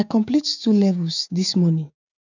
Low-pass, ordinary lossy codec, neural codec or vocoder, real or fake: 7.2 kHz; none; codec, 16 kHz, 6 kbps, DAC; fake